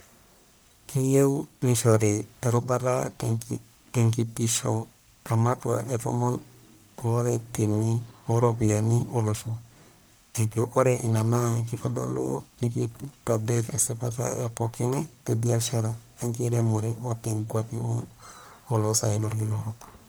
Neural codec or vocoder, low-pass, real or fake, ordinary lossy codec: codec, 44.1 kHz, 1.7 kbps, Pupu-Codec; none; fake; none